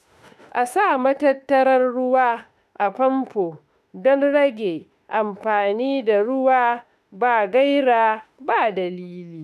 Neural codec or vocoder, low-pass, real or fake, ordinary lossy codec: autoencoder, 48 kHz, 32 numbers a frame, DAC-VAE, trained on Japanese speech; 14.4 kHz; fake; none